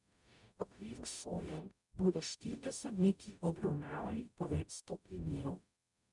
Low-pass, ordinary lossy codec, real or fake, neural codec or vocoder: 10.8 kHz; none; fake; codec, 44.1 kHz, 0.9 kbps, DAC